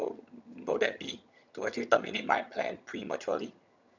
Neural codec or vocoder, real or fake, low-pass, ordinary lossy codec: vocoder, 22.05 kHz, 80 mel bands, HiFi-GAN; fake; 7.2 kHz; none